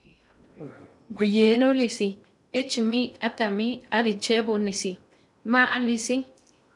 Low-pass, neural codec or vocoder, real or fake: 10.8 kHz; codec, 16 kHz in and 24 kHz out, 0.6 kbps, FocalCodec, streaming, 2048 codes; fake